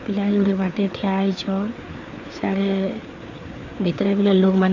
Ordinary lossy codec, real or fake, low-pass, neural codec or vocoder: none; fake; 7.2 kHz; codec, 16 kHz, 4 kbps, FreqCodec, larger model